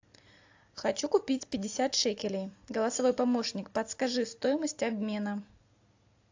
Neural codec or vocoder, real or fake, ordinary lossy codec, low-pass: none; real; AAC, 48 kbps; 7.2 kHz